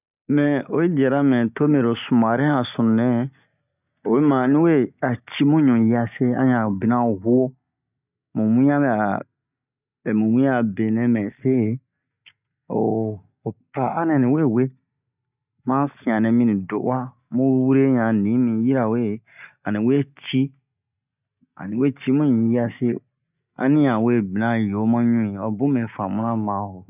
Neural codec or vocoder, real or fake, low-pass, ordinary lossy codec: none; real; 3.6 kHz; none